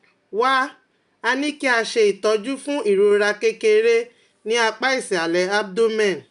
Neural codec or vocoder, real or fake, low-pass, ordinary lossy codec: none; real; 10.8 kHz; none